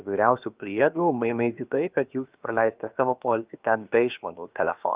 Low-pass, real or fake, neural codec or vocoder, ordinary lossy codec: 3.6 kHz; fake; codec, 16 kHz, about 1 kbps, DyCAST, with the encoder's durations; Opus, 24 kbps